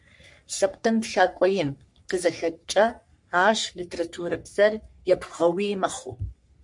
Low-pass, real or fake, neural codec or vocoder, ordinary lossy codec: 10.8 kHz; fake; codec, 44.1 kHz, 3.4 kbps, Pupu-Codec; MP3, 64 kbps